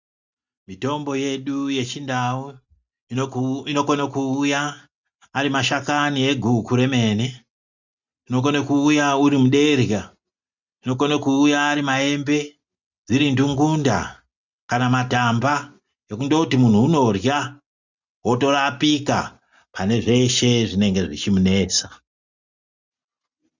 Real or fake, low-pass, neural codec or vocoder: real; 7.2 kHz; none